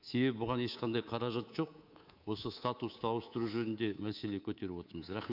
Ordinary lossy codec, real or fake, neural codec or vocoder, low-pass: none; fake; codec, 24 kHz, 3.1 kbps, DualCodec; 5.4 kHz